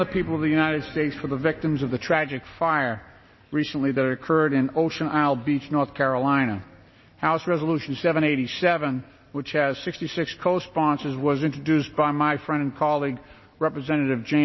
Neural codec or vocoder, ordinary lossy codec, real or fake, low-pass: none; MP3, 24 kbps; real; 7.2 kHz